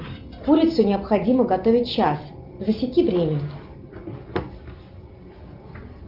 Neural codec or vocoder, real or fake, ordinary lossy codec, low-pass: none; real; Opus, 32 kbps; 5.4 kHz